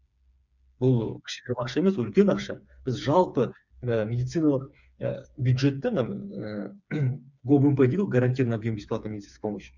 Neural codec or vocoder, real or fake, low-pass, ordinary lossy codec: codec, 16 kHz, 4 kbps, FreqCodec, smaller model; fake; 7.2 kHz; none